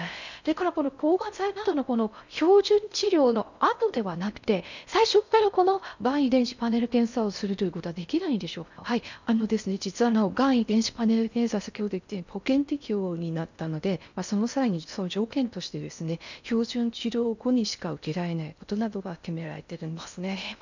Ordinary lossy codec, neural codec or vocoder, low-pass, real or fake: none; codec, 16 kHz in and 24 kHz out, 0.6 kbps, FocalCodec, streaming, 4096 codes; 7.2 kHz; fake